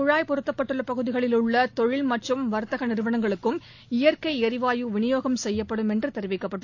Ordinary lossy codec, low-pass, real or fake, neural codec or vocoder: AAC, 48 kbps; 7.2 kHz; real; none